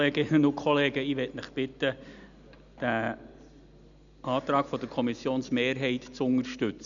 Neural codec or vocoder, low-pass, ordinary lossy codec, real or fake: none; 7.2 kHz; none; real